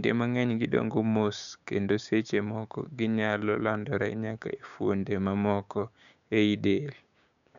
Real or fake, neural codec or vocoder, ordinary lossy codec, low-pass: fake; codec, 16 kHz, 6 kbps, DAC; none; 7.2 kHz